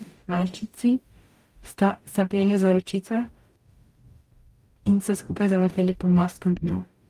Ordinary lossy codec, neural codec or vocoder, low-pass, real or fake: Opus, 32 kbps; codec, 44.1 kHz, 0.9 kbps, DAC; 14.4 kHz; fake